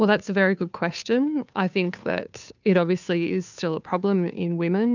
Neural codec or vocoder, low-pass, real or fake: codec, 16 kHz, 2 kbps, FunCodec, trained on Chinese and English, 25 frames a second; 7.2 kHz; fake